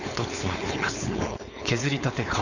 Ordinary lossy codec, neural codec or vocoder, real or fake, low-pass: none; codec, 16 kHz, 4.8 kbps, FACodec; fake; 7.2 kHz